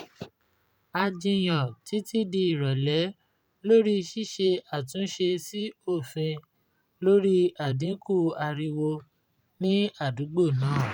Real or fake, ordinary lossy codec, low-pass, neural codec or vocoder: fake; none; 19.8 kHz; vocoder, 44.1 kHz, 128 mel bands every 512 samples, BigVGAN v2